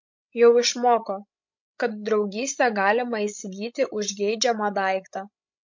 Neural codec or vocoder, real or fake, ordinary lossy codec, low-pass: codec, 16 kHz, 16 kbps, FreqCodec, larger model; fake; MP3, 48 kbps; 7.2 kHz